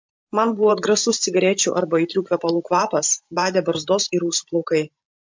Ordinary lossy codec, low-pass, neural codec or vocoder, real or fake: MP3, 48 kbps; 7.2 kHz; vocoder, 44.1 kHz, 128 mel bands every 512 samples, BigVGAN v2; fake